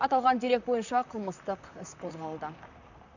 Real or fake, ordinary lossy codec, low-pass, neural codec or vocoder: fake; none; 7.2 kHz; vocoder, 44.1 kHz, 128 mel bands, Pupu-Vocoder